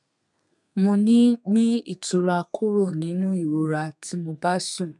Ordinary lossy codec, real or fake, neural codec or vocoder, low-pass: none; fake; codec, 32 kHz, 1.9 kbps, SNAC; 10.8 kHz